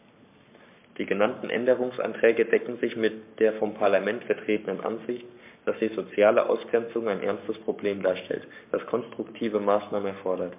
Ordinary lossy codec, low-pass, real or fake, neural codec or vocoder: MP3, 32 kbps; 3.6 kHz; fake; codec, 44.1 kHz, 7.8 kbps, Pupu-Codec